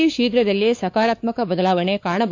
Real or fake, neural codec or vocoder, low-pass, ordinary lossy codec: fake; codec, 16 kHz, 4 kbps, X-Codec, WavLM features, trained on Multilingual LibriSpeech; 7.2 kHz; AAC, 48 kbps